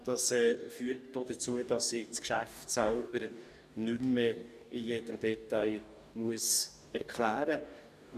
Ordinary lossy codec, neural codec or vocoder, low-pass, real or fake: none; codec, 44.1 kHz, 2.6 kbps, DAC; 14.4 kHz; fake